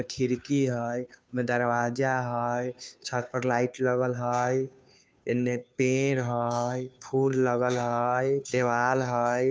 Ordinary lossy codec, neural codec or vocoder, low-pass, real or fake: none; codec, 16 kHz, 2 kbps, FunCodec, trained on Chinese and English, 25 frames a second; none; fake